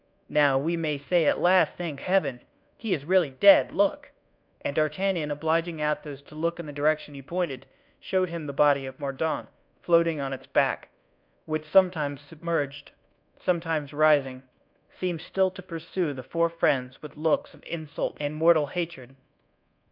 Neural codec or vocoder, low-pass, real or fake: codec, 24 kHz, 1.2 kbps, DualCodec; 5.4 kHz; fake